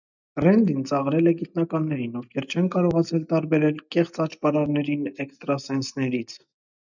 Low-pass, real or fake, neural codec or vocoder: 7.2 kHz; real; none